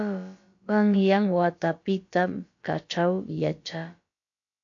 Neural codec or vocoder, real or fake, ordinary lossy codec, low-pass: codec, 16 kHz, about 1 kbps, DyCAST, with the encoder's durations; fake; AAC, 48 kbps; 7.2 kHz